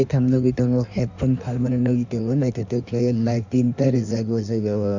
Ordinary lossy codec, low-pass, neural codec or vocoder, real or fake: none; 7.2 kHz; codec, 16 kHz in and 24 kHz out, 1.1 kbps, FireRedTTS-2 codec; fake